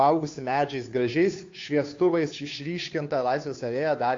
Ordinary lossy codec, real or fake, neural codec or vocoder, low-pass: AAC, 48 kbps; fake; codec, 16 kHz, 2 kbps, FunCodec, trained on Chinese and English, 25 frames a second; 7.2 kHz